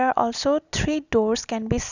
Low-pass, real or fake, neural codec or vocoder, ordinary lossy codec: 7.2 kHz; real; none; none